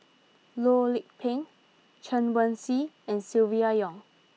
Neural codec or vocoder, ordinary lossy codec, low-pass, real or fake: none; none; none; real